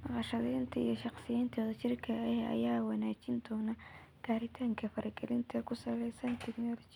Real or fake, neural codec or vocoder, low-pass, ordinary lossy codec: real; none; 19.8 kHz; none